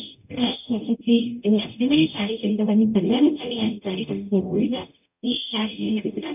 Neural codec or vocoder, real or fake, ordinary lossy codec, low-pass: codec, 44.1 kHz, 0.9 kbps, DAC; fake; none; 3.6 kHz